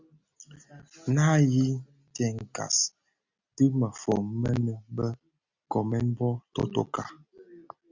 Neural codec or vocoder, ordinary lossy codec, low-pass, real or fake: none; Opus, 64 kbps; 7.2 kHz; real